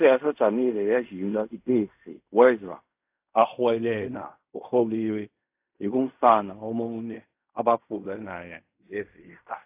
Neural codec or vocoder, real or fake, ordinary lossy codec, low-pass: codec, 16 kHz in and 24 kHz out, 0.4 kbps, LongCat-Audio-Codec, fine tuned four codebook decoder; fake; AAC, 32 kbps; 3.6 kHz